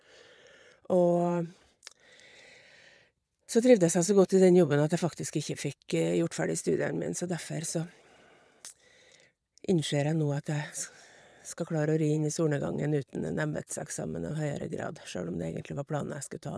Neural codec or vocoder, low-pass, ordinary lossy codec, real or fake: vocoder, 22.05 kHz, 80 mel bands, Vocos; none; none; fake